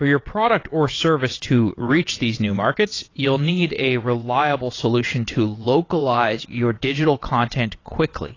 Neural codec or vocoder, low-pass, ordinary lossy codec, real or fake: vocoder, 22.05 kHz, 80 mel bands, WaveNeXt; 7.2 kHz; AAC, 32 kbps; fake